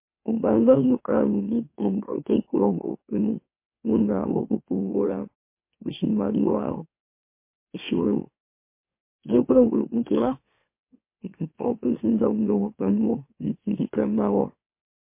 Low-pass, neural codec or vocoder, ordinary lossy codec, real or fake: 3.6 kHz; autoencoder, 44.1 kHz, a latent of 192 numbers a frame, MeloTTS; MP3, 24 kbps; fake